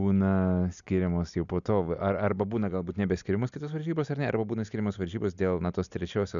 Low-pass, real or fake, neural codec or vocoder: 7.2 kHz; real; none